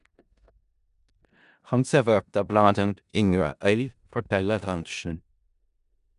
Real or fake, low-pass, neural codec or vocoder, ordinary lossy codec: fake; 10.8 kHz; codec, 16 kHz in and 24 kHz out, 0.4 kbps, LongCat-Audio-Codec, four codebook decoder; none